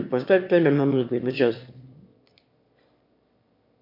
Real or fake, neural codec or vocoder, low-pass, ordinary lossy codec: fake; autoencoder, 22.05 kHz, a latent of 192 numbers a frame, VITS, trained on one speaker; 5.4 kHz; MP3, 32 kbps